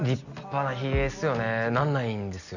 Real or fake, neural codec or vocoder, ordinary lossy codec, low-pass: real; none; none; 7.2 kHz